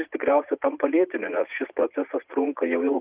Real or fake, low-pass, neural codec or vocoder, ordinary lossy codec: fake; 3.6 kHz; vocoder, 44.1 kHz, 80 mel bands, Vocos; Opus, 32 kbps